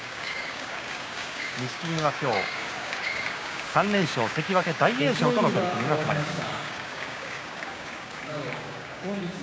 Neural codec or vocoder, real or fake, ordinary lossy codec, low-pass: codec, 16 kHz, 6 kbps, DAC; fake; none; none